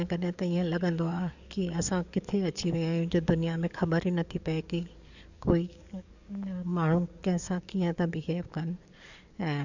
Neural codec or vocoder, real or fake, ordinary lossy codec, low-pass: codec, 44.1 kHz, 7.8 kbps, DAC; fake; none; 7.2 kHz